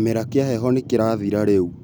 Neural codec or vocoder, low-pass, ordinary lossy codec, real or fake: none; none; none; real